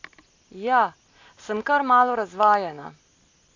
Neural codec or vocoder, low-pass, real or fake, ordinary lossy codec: none; 7.2 kHz; real; AAC, 48 kbps